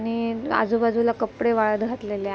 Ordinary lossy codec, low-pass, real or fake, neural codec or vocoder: none; none; real; none